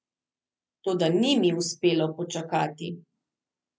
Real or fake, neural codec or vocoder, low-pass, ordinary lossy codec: real; none; none; none